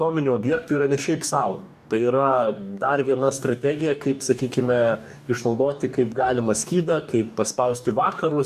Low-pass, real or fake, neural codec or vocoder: 14.4 kHz; fake; codec, 44.1 kHz, 2.6 kbps, DAC